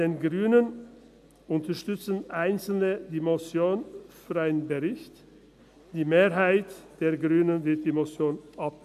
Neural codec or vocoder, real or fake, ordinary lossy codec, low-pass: none; real; none; 14.4 kHz